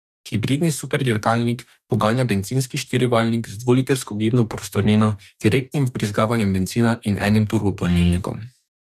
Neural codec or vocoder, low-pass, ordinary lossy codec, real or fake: codec, 44.1 kHz, 2.6 kbps, DAC; 14.4 kHz; none; fake